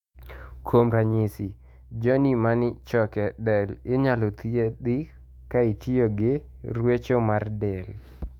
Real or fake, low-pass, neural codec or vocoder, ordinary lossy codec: fake; 19.8 kHz; autoencoder, 48 kHz, 128 numbers a frame, DAC-VAE, trained on Japanese speech; MP3, 96 kbps